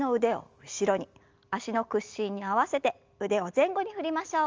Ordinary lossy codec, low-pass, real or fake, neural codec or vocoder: Opus, 32 kbps; 7.2 kHz; real; none